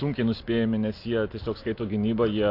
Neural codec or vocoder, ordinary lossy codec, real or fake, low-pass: none; AAC, 32 kbps; real; 5.4 kHz